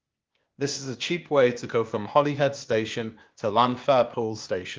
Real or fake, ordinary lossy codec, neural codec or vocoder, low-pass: fake; Opus, 24 kbps; codec, 16 kHz, 0.8 kbps, ZipCodec; 7.2 kHz